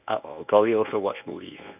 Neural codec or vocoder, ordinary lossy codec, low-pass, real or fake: codec, 24 kHz, 0.9 kbps, WavTokenizer, medium speech release version 1; none; 3.6 kHz; fake